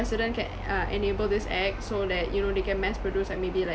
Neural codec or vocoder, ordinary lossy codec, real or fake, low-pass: none; none; real; none